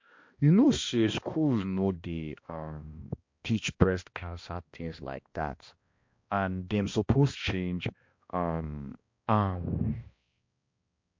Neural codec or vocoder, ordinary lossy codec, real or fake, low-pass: codec, 16 kHz, 1 kbps, X-Codec, HuBERT features, trained on balanced general audio; MP3, 48 kbps; fake; 7.2 kHz